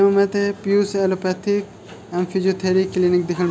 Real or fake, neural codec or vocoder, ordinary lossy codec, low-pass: real; none; none; none